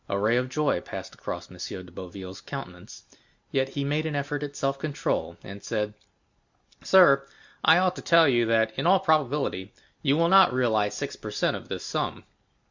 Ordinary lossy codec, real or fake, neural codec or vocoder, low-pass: Opus, 64 kbps; real; none; 7.2 kHz